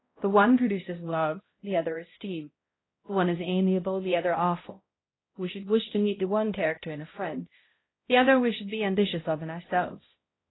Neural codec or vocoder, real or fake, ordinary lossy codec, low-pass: codec, 16 kHz, 0.5 kbps, X-Codec, HuBERT features, trained on balanced general audio; fake; AAC, 16 kbps; 7.2 kHz